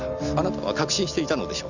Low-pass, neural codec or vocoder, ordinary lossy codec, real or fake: 7.2 kHz; none; none; real